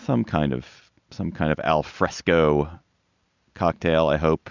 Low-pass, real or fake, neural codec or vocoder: 7.2 kHz; real; none